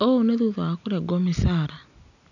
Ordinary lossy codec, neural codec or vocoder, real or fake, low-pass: none; none; real; 7.2 kHz